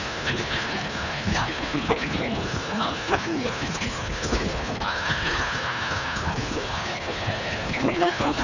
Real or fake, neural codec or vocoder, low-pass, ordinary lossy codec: fake; codec, 24 kHz, 1.5 kbps, HILCodec; 7.2 kHz; none